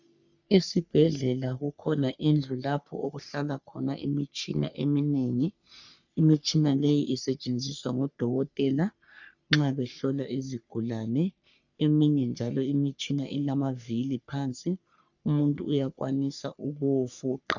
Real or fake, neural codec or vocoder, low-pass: fake; codec, 44.1 kHz, 3.4 kbps, Pupu-Codec; 7.2 kHz